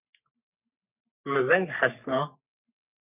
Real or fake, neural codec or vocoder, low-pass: fake; codec, 44.1 kHz, 3.4 kbps, Pupu-Codec; 3.6 kHz